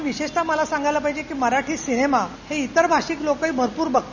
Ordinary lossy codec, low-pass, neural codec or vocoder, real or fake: none; 7.2 kHz; none; real